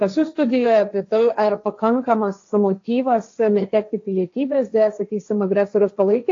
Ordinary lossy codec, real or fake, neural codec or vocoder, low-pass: AAC, 48 kbps; fake; codec, 16 kHz, 1.1 kbps, Voila-Tokenizer; 7.2 kHz